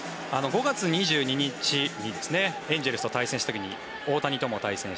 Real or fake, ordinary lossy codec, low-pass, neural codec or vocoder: real; none; none; none